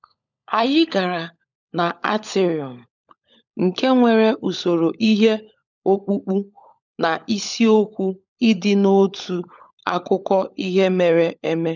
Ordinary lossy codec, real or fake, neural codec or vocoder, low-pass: none; fake; codec, 16 kHz, 16 kbps, FunCodec, trained on LibriTTS, 50 frames a second; 7.2 kHz